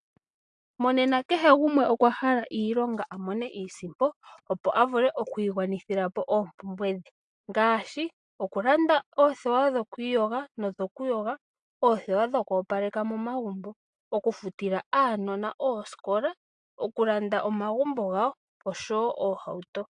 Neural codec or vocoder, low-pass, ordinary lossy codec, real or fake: none; 9.9 kHz; AAC, 64 kbps; real